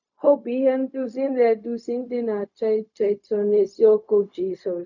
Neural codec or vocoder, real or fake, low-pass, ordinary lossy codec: codec, 16 kHz, 0.4 kbps, LongCat-Audio-Codec; fake; 7.2 kHz; none